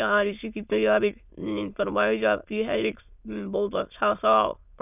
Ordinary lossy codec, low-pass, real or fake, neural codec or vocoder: none; 3.6 kHz; fake; autoencoder, 22.05 kHz, a latent of 192 numbers a frame, VITS, trained on many speakers